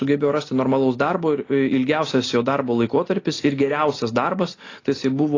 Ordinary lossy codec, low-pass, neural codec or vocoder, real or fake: AAC, 32 kbps; 7.2 kHz; none; real